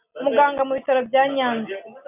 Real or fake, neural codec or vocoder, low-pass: real; none; 3.6 kHz